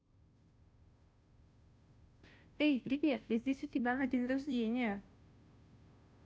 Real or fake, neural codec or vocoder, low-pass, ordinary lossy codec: fake; codec, 16 kHz, 0.5 kbps, FunCodec, trained on Chinese and English, 25 frames a second; none; none